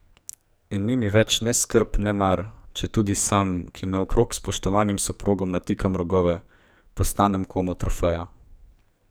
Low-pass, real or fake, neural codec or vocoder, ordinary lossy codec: none; fake; codec, 44.1 kHz, 2.6 kbps, SNAC; none